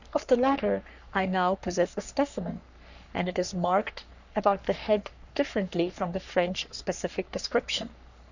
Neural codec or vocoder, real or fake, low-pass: codec, 44.1 kHz, 3.4 kbps, Pupu-Codec; fake; 7.2 kHz